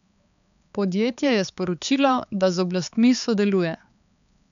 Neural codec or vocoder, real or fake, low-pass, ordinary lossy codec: codec, 16 kHz, 4 kbps, X-Codec, HuBERT features, trained on balanced general audio; fake; 7.2 kHz; none